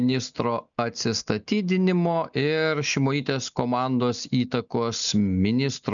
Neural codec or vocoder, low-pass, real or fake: none; 7.2 kHz; real